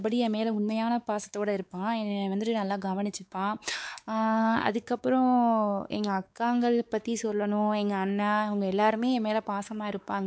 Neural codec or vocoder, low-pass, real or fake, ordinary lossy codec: codec, 16 kHz, 4 kbps, X-Codec, WavLM features, trained on Multilingual LibriSpeech; none; fake; none